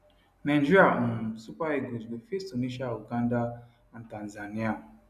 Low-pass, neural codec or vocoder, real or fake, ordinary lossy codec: 14.4 kHz; none; real; none